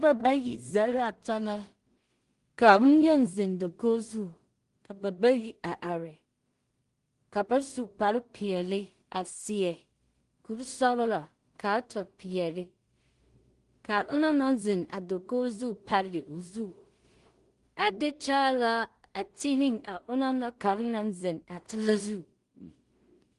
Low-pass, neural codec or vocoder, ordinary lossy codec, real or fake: 10.8 kHz; codec, 16 kHz in and 24 kHz out, 0.4 kbps, LongCat-Audio-Codec, two codebook decoder; Opus, 24 kbps; fake